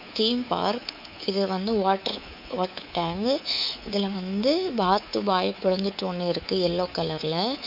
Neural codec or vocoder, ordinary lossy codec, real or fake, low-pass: codec, 24 kHz, 3.1 kbps, DualCodec; none; fake; 5.4 kHz